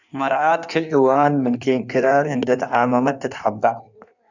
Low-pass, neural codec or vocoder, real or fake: 7.2 kHz; codec, 16 kHz in and 24 kHz out, 1.1 kbps, FireRedTTS-2 codec; fake